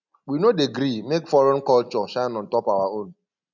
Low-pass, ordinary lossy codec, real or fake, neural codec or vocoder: 7.2 kHz; none; real; none